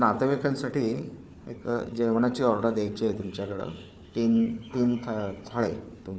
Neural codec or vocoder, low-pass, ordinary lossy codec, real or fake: codec, 16 kHz, 4 kbps, FunCodec, trained on Chinese and English, 50 frames a second; none; none; fake